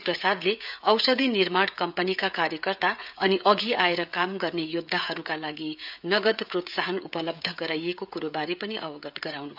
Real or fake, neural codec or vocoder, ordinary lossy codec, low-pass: fake; codec, 16 kHz, 16 kbps, FreqCodec, smaller model; none; 5.4 kHz